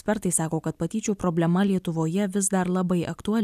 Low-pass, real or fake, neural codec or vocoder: 14.4 kHz; real; none